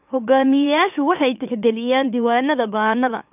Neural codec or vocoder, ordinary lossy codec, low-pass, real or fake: autoencoder, 44.1 kHz, a latent of 192 numbers a frame, MeloTTS; none; 3.6 kHz; fake